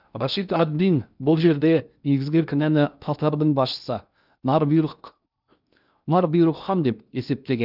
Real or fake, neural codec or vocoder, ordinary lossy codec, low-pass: fake; codec, 16 kHz in and 24 kHz out, 0.6 kbps, FocalCodec, streaming, 2048 codes; none; 5.4 kHz